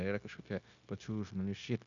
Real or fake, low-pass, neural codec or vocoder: fake; 7.2 kHz; codec, 16 kHz, 0.9 kbps, LongCat-Audio-Codec